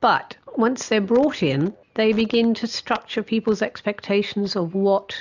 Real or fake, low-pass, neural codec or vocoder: real; 7.2 kHz; none